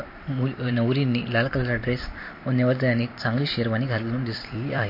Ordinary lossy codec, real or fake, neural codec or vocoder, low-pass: MP3, 32 kbps; fake; vocoder, 44.1 kHz, 128 mel bands every 256 samples, BigVGAN v2; 5.4 kHz